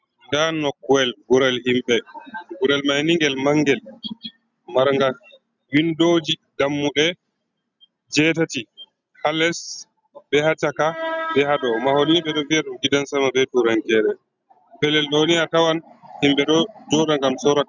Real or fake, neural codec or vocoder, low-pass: real; none; 7.2 kHz